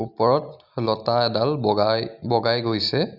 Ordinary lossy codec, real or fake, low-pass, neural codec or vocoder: Opus, 64 kbps; real; 5.4 kHz; none